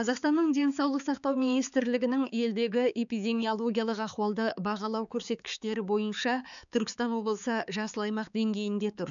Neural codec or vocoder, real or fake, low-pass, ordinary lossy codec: codec, 16 kHz, 4 kbps, X-Codec, HuBERT features, trained on balanced general audio; fake; 7.2 kHz; none